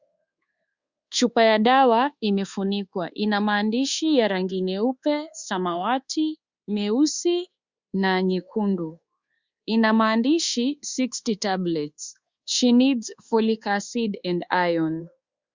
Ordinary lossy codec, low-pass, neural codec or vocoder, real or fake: Opus, 64 kbps; 7.2 kHz; codec, 24 kHz, 1.2 kbps, DualCodec; fake